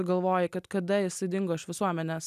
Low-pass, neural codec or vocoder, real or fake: 14.4 kHz; none; real